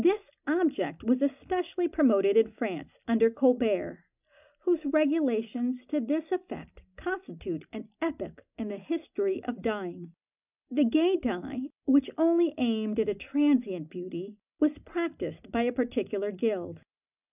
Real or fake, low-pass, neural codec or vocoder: real; 3.6 kHz; none